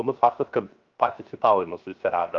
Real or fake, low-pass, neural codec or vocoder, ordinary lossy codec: fake; 7.2 kHz; codec, 16 kHz, 0.7 kbps, FocalCodec; Opus, 32 kbps